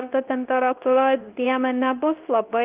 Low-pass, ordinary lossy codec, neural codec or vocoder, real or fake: 3.6 kHz; Opus, 32 kbps; codec, 24 kHz, 0.9 kbps, WavTokenizer, medium speech release version 2; fake